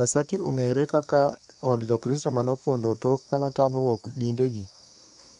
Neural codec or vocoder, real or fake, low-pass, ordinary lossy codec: codec, 24 kHz, 1 kbps, SNAC; fake; 10.8 kHz; none